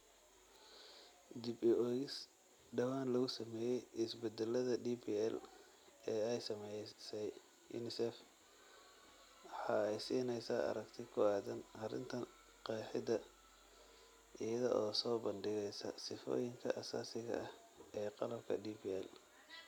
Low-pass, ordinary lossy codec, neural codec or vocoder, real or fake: 19.8 kHz; none; none; real